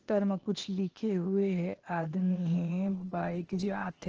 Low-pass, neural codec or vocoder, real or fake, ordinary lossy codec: 7.2 kHz; codec, 16 kHz, 0.8 kbps, ZipCodec; fake; Opus, 16 kbps